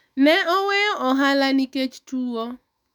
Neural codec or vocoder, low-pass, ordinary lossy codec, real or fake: autoencoder, 48 kHz, 128 numbers a frame, DAC-VAE, trained on Japanese speech; 19.8 kHz; none; fake